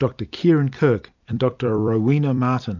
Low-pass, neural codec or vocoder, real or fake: 7.2 kHz; vocoder, 44.1 kHz, 128 mel bands every 256 samples, BigVGAN v2; fake